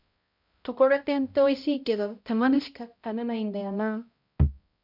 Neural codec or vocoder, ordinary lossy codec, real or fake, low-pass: codec, 16 kHz, 0.5 kbps, X-Codec, HuBERT features, trained on balanced general audio; MP3, 48 kbps; fake; 5.4 kHz